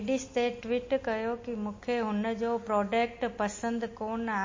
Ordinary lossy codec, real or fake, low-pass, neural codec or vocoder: MP3, 32 kbps; real; 7.2 kHz; none